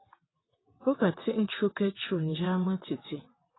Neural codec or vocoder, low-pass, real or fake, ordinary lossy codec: vocoder, 22.05 kHz, 80 mel bands, WaveNeXt; 7.2 kHz; fake; AAC, 16 kbps